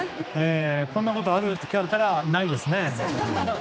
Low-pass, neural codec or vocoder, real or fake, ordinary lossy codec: none; codec, 16 kHz, 2 kbps, X-Codec, HuBERT features, trained on general audio; fake; none